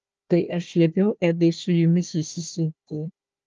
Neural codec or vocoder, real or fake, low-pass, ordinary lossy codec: codec, 16 kHz, 1 kbps, FunCodec, trained on Chinese and English, 50 frames a second; fake; 7.2 kHz; Opus, 24 kbps